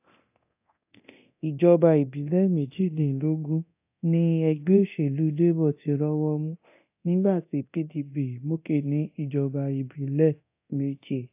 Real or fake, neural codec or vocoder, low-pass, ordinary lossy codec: fake; codec, 24 kHz, 0.9 kbps, DualCodec; 3.6 kHz; AAC, 32 kbps